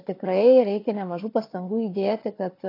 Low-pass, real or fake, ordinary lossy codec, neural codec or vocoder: 5.4 kHz; fake; AAC, 24 kbps; codec, 16 kHz, 4 kbps, FunCodec, trained on Chinese and English, 50 frames a second